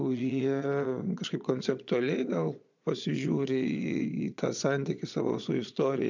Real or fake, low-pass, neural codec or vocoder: fake; 7.2 kHz; vocoder, 22.05 kHz, 80 mel bands, Vocos